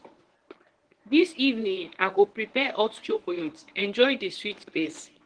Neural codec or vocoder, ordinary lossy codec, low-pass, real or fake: codec, 24 kHz, 0.9 kbps, WavTokenizer, medium speech release version 1; Opus, 16 kbps; 9.9 kHz; fake